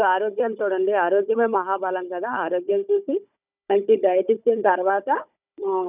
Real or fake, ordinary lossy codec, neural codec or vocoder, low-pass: fake; none; codec, 16 kHz, 16 kbps, FunCodec, trained on Chinese and English, 50 frames a second; 3.6 kHz